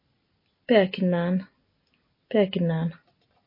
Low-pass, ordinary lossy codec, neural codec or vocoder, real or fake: 5.4 kHz; MP3, 24 kbps; none; real